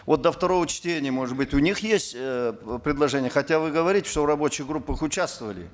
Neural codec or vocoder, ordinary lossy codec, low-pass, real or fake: none; none; none; real